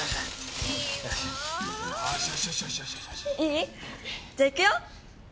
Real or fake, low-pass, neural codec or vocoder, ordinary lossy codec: real; none; none; none